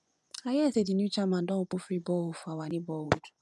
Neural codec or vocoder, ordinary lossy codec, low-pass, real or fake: vocoder, 24 kHz, 100 mel bands, Vocos; none; none; fake